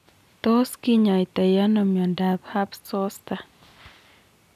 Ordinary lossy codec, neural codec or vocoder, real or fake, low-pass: none; none; real; 14.4 kHz